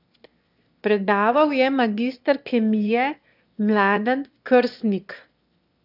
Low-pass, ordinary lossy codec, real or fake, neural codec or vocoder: 5.4 kHz; none; fake; autoencoder, 22.05 kHz, a latent of 192 numbers a frame, VITS, trained on one speaker